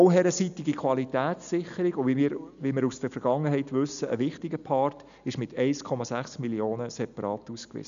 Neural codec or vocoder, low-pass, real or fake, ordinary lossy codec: none; 7.2 kHz; real; none